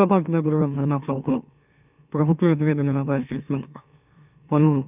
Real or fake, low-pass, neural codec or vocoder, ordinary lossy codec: fake; 3.6 kHz; autoencoder, 44.1 kHz, a latent of 192 numbers a frame, MeloTTS; none